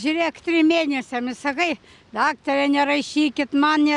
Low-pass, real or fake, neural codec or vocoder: 10.8 kHz; real; none